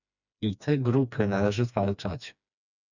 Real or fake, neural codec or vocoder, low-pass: fake; codec, 16 kHz, 2 kbps, FreqCodec, smaller model; 7.2 kHz